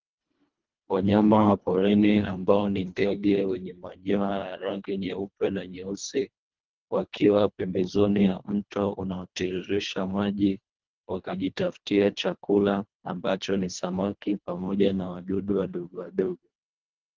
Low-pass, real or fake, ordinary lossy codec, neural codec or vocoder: 7.2 kHz; fake; Opus, 24 kbps; codec, 24 kHz, 1.5 kbps, HILCodec